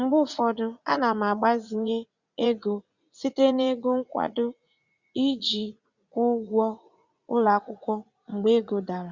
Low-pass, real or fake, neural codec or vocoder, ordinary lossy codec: 7.2 kHz; fake; vocoder, 44.1 kHz, 80 mel bands, Vocos; Opus, 64 kbps